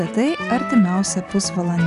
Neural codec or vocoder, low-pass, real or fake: none; 10.8 kHz; real